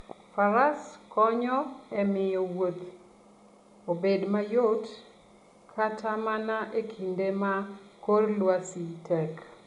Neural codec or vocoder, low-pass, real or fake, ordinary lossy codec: none; 10.8 kHz; real; none